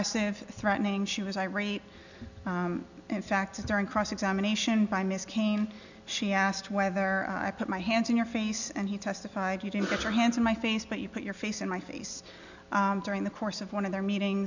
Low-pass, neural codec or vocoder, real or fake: 7.2 kHz; none; real